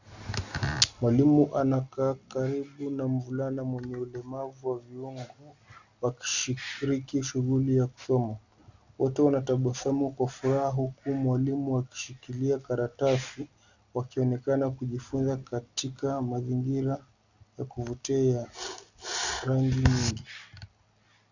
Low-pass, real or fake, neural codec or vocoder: 7.2 kHz; real; none